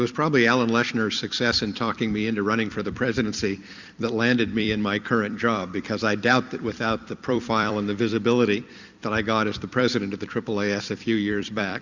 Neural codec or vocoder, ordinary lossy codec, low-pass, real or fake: vocoder, 44.1 kHz, 128 mel bands every 256 samples, BigVGAN v2; Opus, 64 kbps; 7.2 kHz; fake